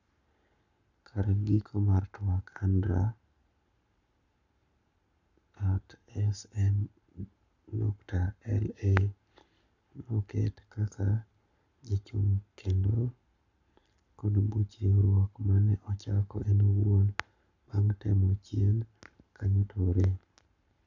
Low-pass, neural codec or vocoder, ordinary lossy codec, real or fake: 7.2 kHz; codec, 44.1 kHz, 7.8 kbps, Pupu-Codec; none; fake